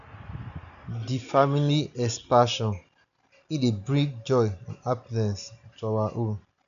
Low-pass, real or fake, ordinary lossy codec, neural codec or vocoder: 7.2 kHz; real; none; none